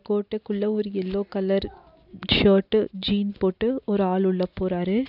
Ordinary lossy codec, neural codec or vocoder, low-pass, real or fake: none; none; 5.4 kHz; real